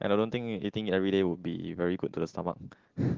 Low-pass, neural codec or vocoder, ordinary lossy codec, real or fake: 7.2 kHz; none; Opus, 16 kbps; real